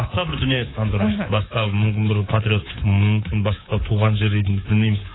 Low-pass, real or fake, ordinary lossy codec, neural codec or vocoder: 7.2 kHz; fake; AAC, 16 kbps; codec, 24 kHz, 3.1 kbps, DualCodec